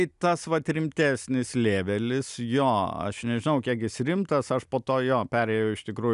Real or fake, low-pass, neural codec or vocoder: real; 10.8 kHz; none